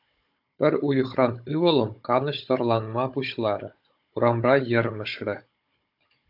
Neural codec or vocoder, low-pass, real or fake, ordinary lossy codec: codec, 16 kHz, 16 kbps, FunCodec, trained on Chinese and English, 50 frames a second; 5.4 kHz; fake; AAC, 48 kbps